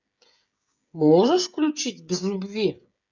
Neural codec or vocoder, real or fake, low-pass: codec, 16 kHz, 16 kbps, FreqCodec, smaller model; fake; 7.2 kHz